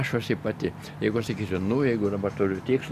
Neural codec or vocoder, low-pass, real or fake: none; 14.4 kHz; real